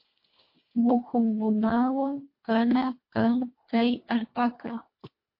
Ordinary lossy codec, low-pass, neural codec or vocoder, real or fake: MP3, 32 kbps; 5.4 kHz; codec, 24 kHz, 1.5 kbps, HILCodec; fake